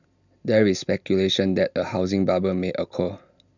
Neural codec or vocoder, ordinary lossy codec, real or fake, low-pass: none; none; real; 7.2 kHz